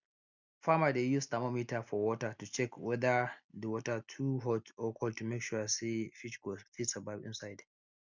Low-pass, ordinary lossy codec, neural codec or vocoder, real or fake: 7.2 kHz; none; none; real